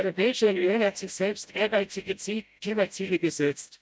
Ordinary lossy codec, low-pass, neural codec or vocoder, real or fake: none; none; codec, 16 kHz, 0.5 kbps, FreqCodec, smaller model; fake